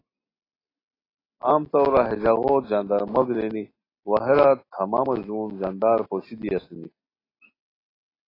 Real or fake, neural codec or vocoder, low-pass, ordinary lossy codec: real; none; 5.4 kHz; AAC, 24 kbps